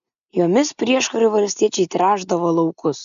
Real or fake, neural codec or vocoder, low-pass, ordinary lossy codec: real; none; 7.2 kHz; AAC, 96 kbps